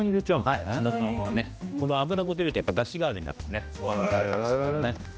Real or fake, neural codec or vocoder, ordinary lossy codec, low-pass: fake; codec, 16 kHz, 1 kbps, X-Codec, HuBERT features, trained on general audio; none; none